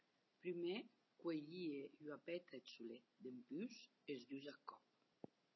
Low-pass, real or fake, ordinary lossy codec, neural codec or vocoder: 7.2 kHz; real; MP3, 24 kbps; none